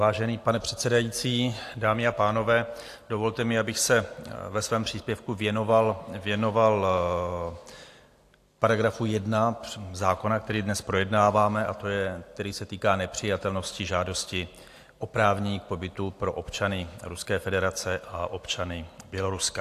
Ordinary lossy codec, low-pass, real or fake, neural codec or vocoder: AAC, 64 kbps; 14.4 kHz; real; none